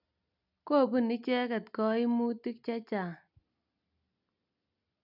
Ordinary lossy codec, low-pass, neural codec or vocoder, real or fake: none; 5.4 kHz; none; real